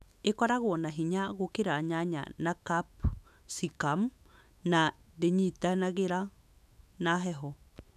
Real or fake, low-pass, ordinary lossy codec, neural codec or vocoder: fake; 14.4 kHz; none; autoencoder, 48 kHz, 128 numbers a frame, DAC-VAE, trained on Japanese speech